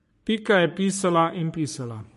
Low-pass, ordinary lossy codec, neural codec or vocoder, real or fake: 14.4 kHz; MP3, 48 kbps; codec, 44.1 kHz, 3.4 kbps, Pupu-Codec; fake